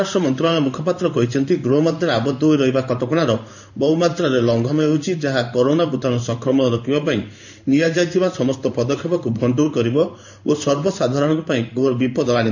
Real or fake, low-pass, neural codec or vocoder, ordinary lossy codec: fake; 7.2 kHz; codec, 16 kHz in and 24 kHz out, 1 kbps, XY-Tokenizer; none